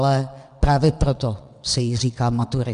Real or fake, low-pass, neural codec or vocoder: fake; 9.9 kHz; vocoder, 22.05 kHz, 80 mel bands, WaveNeXt